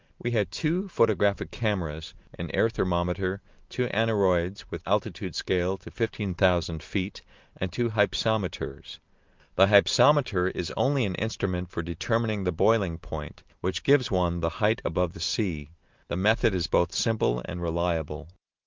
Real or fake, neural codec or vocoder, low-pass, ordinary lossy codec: real; none; 7.2 kHz; Opus, 32 kbps